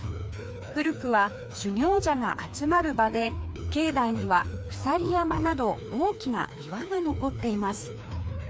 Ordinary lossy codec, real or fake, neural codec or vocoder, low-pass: none; fake; codec, 16 kHz, 2 kbps, FreqCodec, larger model; none